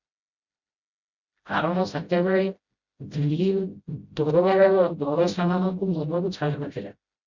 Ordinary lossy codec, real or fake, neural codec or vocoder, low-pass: Opus, 64 kbps; fake; codec, 16 kHz, 0.5 kbps, FreqCodec, smaller model; 7.2 kHz